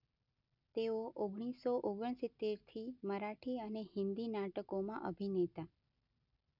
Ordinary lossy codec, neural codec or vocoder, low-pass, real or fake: none; none; 5.4 kHz; real